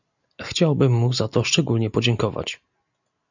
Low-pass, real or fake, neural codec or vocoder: 7.2 kHz; real; none